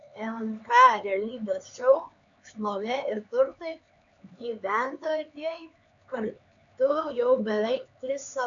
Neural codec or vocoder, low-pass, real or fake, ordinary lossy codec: codec, 16 kHz, 4 kbps, X-Codec, HuBERT features, trained on LibriSpeech; 7.2 kHz; fake; MP3, 96 kbps